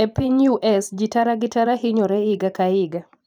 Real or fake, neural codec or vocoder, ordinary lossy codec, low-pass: fake; vocoder, 44.1 kHz, 128 mel bands every 512 samples, BigVGAN v2; none; 19.8 kHz